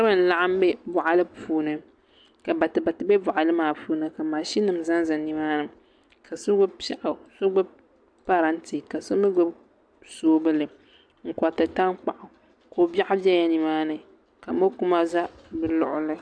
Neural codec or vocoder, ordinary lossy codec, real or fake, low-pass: none; AAC, 64 kbps; real; 9.9 kHz